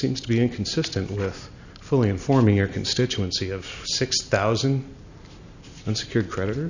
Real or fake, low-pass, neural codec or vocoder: real; 7.2 kHz; none